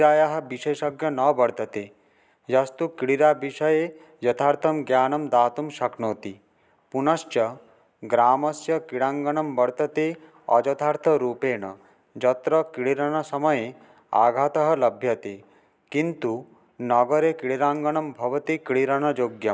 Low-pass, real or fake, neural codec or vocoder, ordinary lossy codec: none; real; none; none